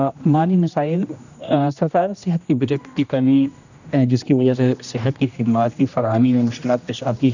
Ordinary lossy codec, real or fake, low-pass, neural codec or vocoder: none; fake; 7.2 kHz; codec, 16 kHz, 1 kbps, X-Codec, HuBERT features, trained on general audio